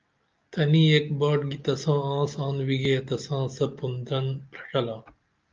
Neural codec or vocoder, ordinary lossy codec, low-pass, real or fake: none; Opus, 24 kbps; 7.2 kHz; real